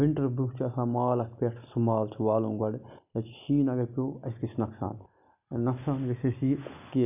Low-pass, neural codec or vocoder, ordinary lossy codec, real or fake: 3.6 kHz; none; none; real